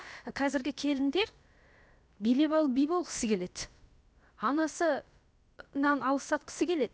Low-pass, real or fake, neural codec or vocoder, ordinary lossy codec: none; fake; codec, 16 kHz, about 1 kbps, DyCAST, with the encoder's durations; none